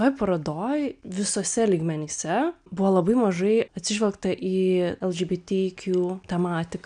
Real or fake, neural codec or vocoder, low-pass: real; none; 9.9 kHz